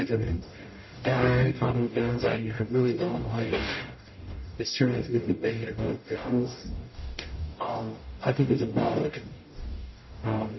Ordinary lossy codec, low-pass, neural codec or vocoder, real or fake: MP3, 24 kbps; 7.2 kHz; codec, 44.1 kHz, 0.9 kbps, DAC; fake